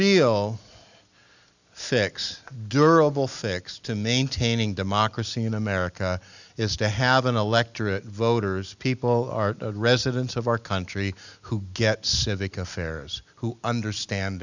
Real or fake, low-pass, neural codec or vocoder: real; 7.2 kHz; none